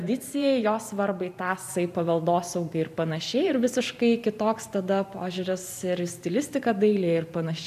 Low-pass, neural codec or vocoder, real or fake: 14.4 kHz; vocoder, 44.1 kHz, 128 mel bands every 512 samples, BigVGAN v2; fake